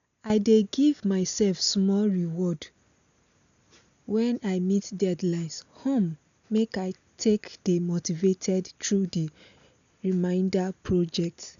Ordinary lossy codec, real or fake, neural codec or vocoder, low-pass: none; real; none; 7.2 kHz